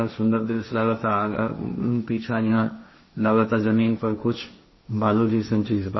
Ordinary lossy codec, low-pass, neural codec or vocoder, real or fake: MP3, 24 kbps; 7.2 kHz; codec, 16 kHz, 1.1 kbps, Voila-Tokenizer; fake